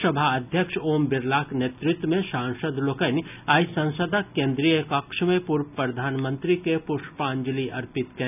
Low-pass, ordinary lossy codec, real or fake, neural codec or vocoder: 3.6 kHz; none; real; none